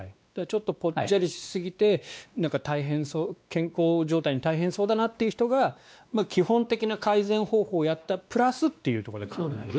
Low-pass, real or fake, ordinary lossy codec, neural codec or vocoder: none; fake; none; codec, 16 kHz, 2 kbps, X-Codec, WavLM features, trained on Multilingual LibriSpeech